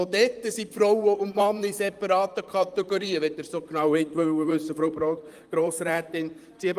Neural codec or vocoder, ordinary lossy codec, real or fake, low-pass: vocoder, 44.1 kHz, 128 mel bands, Pupu-Vocoder; Opus, 32 kbps; fake; 14.4 kHz